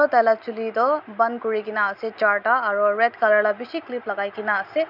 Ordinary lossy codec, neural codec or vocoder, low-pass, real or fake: none; none; 5.4 kHz; real